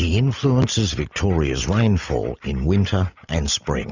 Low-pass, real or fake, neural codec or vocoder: 7.2 kHz; real; none